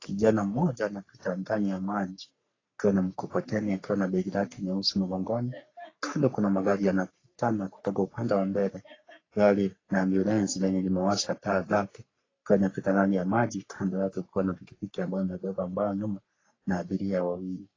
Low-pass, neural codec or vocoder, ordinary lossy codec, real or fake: 7.2 kHz; codec, 44.1 kHz, 3.4 kbps, Pupu-Codec; AAC, 32 kbps; fake